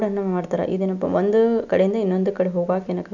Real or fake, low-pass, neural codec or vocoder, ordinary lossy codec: real; 7.2 kHz; none; none